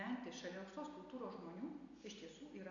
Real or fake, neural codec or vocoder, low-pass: real; none; 7.2 kHz